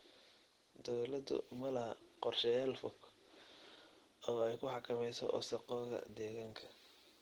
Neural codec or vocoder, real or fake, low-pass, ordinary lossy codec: none; real; 19.8 kHz; Opus, 16 kbps